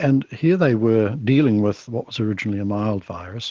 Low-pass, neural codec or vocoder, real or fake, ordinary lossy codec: 7.2 kHz; none; real; Opus, 16 kbps